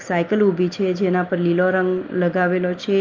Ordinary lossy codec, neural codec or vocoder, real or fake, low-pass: Opus, 24 kbps; none; real; 7.2 kHz